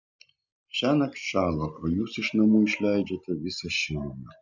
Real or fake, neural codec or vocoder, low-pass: real; none; 7.2 kHz